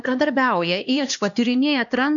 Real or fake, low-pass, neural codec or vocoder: fake; 7.2 kHz; codec, 16 kHz, 2 kbps, X-Codec, HuBERT features, trained on LibriSpeech